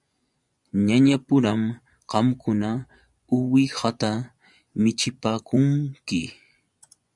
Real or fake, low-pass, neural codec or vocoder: fake; 10.8 kHz; vocoder, 24 kHz, 100 mel bands, Vocos